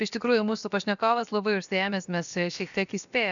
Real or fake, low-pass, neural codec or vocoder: fake; 7.2 kHz; codec, 16 kHz, about 1 kbps, DyCAST, with the encoder's durations